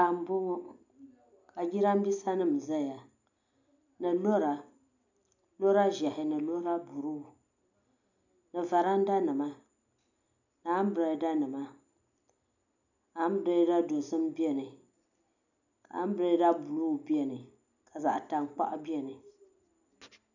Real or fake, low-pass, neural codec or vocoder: real; 7.2 kHz; none